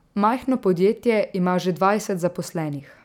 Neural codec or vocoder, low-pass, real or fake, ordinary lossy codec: none; 19.8 kHz; real; none